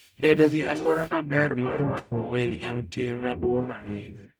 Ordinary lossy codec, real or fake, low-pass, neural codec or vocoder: none; fake; none; codec, 44.1 kHz, 0.9 kbps, DAC